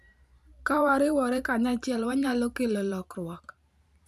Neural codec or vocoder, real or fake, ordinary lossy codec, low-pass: vocoder, 44.1 kHz, 128 mel bands every 512 samples, BigVGAN v2; fake; none; 14.4 kHz